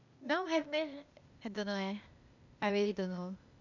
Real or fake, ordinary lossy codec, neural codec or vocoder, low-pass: fake; none; codec, 16 kHz, 0.8 kbps, ZipCodec; 7.2 kHz